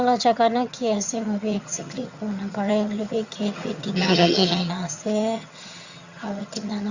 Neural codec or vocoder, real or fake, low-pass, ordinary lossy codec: vocoder, 22.05 kHz, 80 mel bands, HiFi-GAN; fake; 7.2 kHz; Opus, 64 kbps